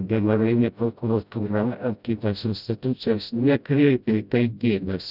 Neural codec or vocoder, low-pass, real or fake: codec, 16 kHz, 0.5 kbps, FreqCodec, smaller model; 5.4 kHz; fake